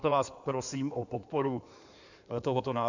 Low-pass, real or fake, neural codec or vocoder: 7.2 kHz; fake; codec, 16 kHz in and 24 kHz out, 2.2 kbps, FireRedTTS-2 codec